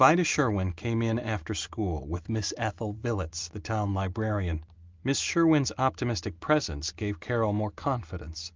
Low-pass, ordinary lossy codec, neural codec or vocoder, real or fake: 7.2 kHz; Opus, 32 kbps; none; real